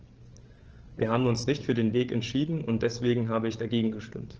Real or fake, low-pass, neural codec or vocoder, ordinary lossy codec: fake; 7.2 kHz; codec, 16 kHz, 8 kbps, FreqCodec, larger model; Opus, 16 kbps